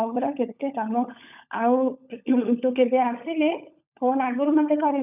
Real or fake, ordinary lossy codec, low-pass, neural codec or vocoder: fake; none; 3.6 kHz; codec, 16 kHz, 16 kbps, FunCodec, trained on LibriTTS, 50 frames a second